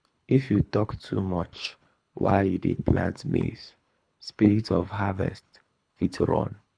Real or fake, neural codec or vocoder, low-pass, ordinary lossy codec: fake; codec, 24 kHz, 3 kbps, HILCodec; 9.9 kHz; none